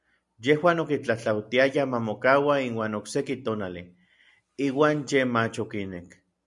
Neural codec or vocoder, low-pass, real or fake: none; 10.8 kHz; real